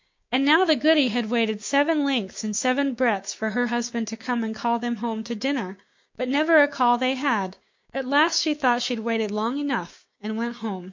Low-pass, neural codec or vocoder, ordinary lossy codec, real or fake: 7.2 kHz; codec, 16 kHz, 6 kbps, DAC; MP3, 48 kbps; fake